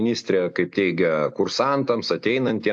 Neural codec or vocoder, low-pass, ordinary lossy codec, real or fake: none; 9.9 kHz; AAC, 64 kbps; real